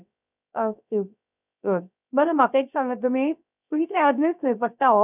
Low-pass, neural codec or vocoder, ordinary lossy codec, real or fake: 3.6 kHz; codec, 16 kHz, 0.3 kbps, FocalCodec; none; fake